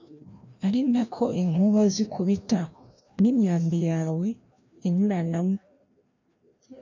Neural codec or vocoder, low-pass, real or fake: codec, 16 kHz, 1 kbps, FreqCodec, larger model; 7.2 kHz; fake